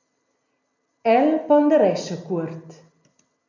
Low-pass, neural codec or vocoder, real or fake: 7.2 kHz; none; real